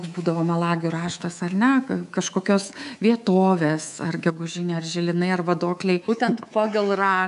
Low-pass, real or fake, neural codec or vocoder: 10.8 kHz; fake; codec, 24 kHz, 3.1 kbps, DualCodec